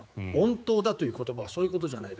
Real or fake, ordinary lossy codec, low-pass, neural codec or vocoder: fake; none; none; codec, 16 kHz, 4 kbps, X-Codec, HuBERT features, trained on general audio